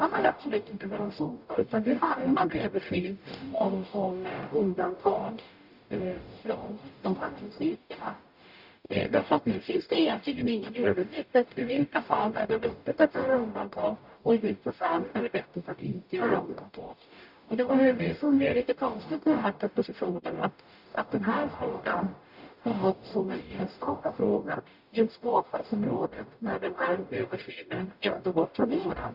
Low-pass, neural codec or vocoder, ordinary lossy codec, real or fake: 5.4 kHz; codec, 44.1 kHz, 0.9 kbps, DAC; Opus, 64 kbps; fake